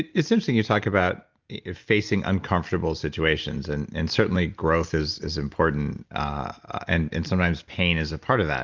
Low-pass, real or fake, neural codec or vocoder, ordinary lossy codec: 7.2 kHz; real; none; Opus, 24 kbps